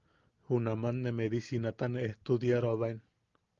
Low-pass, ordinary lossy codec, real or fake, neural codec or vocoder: 7.2 kHz; Opus, 32 kbps; real; none